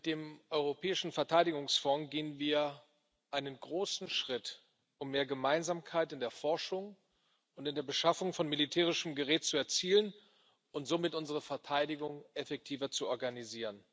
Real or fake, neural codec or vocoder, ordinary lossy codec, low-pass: real; none; none; none